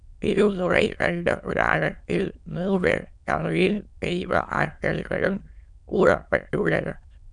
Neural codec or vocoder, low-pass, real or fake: autoencoder, 22.05 kHz, a latent of 192 numbers a frame, VITS, trained on many speakers; 9.9 kHz; fake